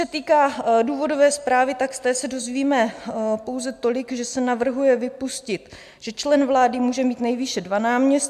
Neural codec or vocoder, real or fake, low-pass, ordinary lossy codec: none; real; 14.4 kHz; AAC, 96 kbps